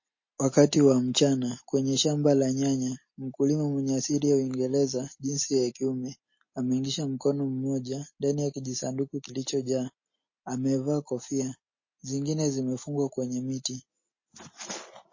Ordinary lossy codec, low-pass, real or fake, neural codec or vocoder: MP3, 32 kbps; 7.2 kHz; real; none